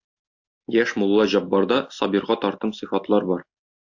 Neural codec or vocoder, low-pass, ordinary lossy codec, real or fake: none; 7.2 kHz; AAC, 48 kbps; real